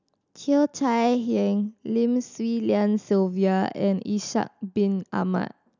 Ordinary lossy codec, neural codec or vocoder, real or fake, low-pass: none; none; real; 7.2 kHz